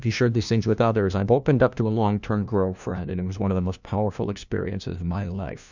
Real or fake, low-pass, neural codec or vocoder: fake; 7.2 kHz; codec, 16 kHz, 1 kbps, FunCodec, trained on LibriTTS, 50 frames a second